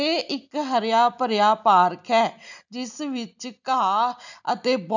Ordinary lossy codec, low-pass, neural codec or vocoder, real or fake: none; 7.2 kHz; none; real